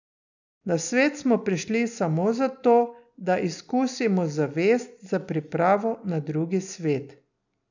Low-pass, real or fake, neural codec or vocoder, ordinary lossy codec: 7.2 kHz; real; none; none